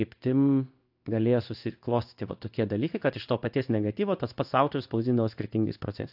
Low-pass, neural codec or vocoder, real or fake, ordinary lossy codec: 5.4 kHz; codec, 16 kHz in and 24 kHz out, 1 kbps, XY-Tokenizer; fake; AAC, 48 kbps